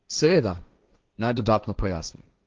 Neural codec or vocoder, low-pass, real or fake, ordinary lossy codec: codec, 16 kHz, 1.1 kbps, Voila-Tokenizer; 7.2 kHz; fake; Opus, 32 kbps